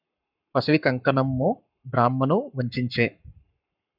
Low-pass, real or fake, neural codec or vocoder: 5.4 kHz; fake; codec, 44.1 kHz, 7.8 kbps, Pupu-Codec